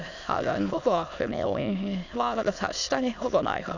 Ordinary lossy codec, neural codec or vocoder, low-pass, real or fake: none; autoencoder, 22.05 kHz, a latent of 192 numbers a frame, VITS, trained on many speakers; 7.2 kHz; fake